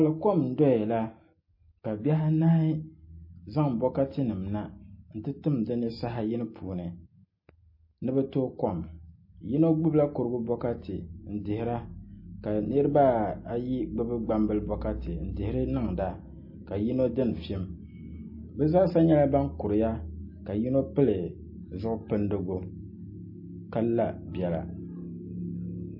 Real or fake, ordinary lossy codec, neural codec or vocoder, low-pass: real; MP3, 24 kbps; none; 5.4 kHz